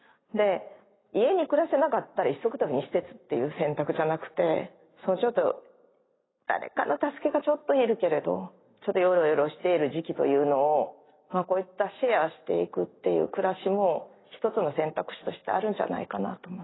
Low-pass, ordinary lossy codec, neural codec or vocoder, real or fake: 7.2 kHz; AAC, 16 kbps; none; real